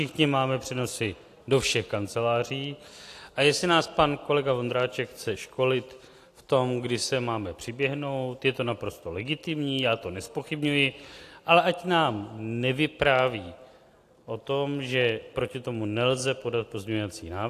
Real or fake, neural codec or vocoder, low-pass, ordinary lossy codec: real; none; 14.4 kHz; AAC, 64 kbps